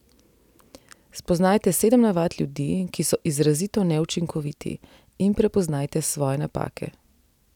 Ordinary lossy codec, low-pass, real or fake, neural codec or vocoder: none; 19.8 kHz; real; none